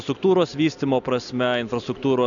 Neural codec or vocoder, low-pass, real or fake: none; 7.2 kHz; real